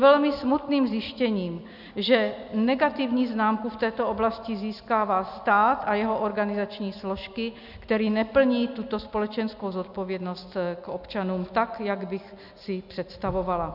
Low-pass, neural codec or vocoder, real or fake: 5.4 kHz; none; real